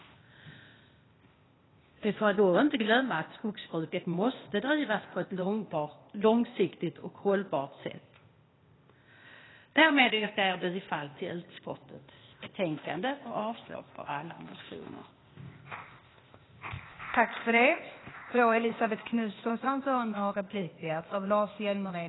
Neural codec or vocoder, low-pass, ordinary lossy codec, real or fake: codec, 16 kHz, 0.8 kbps, ZipCodec; 7.2 kHz; AAC, 16 kbps; fake